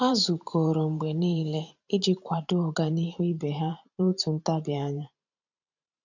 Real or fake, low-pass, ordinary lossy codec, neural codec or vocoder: fake; 7.2 kHz; none; vocoder, 44.1 kHz, 128 mel bands every 512 samples, BigVGAN v2